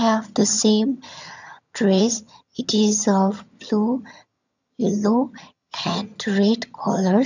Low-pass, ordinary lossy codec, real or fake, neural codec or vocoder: 7.2 kHz; none; fake; vocoder, 22.05 kHz, 80 mel bands, HiFi-GAN